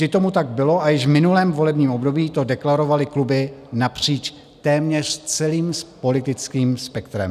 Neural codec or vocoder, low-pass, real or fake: none; 14.4 kHz; real